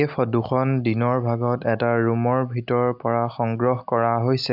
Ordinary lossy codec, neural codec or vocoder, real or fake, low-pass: AAC, 48 kbps; none; real; 5.4 kHz